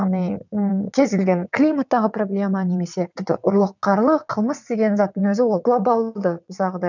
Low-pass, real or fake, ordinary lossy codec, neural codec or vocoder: 7.2 kHz; fake; none; vocoder, 22.05 kHz, 80 mel bands, WaveNeXt